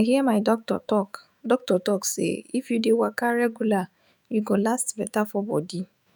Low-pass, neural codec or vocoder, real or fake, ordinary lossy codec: none; autoencoder, 48 kHz, 128 numbers a frame, DAC-VAE, trained on Japanese speech; fake; none